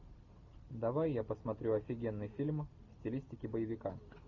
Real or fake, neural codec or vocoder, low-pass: real; none; 7.2 kHz